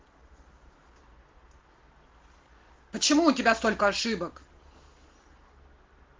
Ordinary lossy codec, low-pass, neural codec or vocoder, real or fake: Opus, 16 kbps; 7.2 kHz; none; real